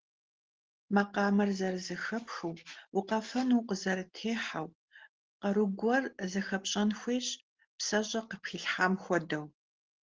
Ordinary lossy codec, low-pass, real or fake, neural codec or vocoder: Opus, 16 kbps; 7.2 kHz; real; none